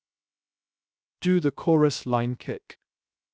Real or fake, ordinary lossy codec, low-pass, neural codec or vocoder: fake; none; none; codec, 16 kHz, 0.7 kbps, FocalCodec